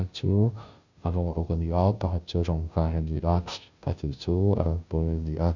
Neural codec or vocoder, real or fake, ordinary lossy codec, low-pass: codec, 16 kHz, 0.5 kbps, FunCodec, trained on Chinese and English, 25 frames a second; fake; none; 7.2 kHz